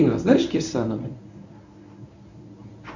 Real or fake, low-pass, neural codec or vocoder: fake; 7.2 kHz; codec, 24 kHz, 0.9 kbps, WavTokenizer, medium speech release version 1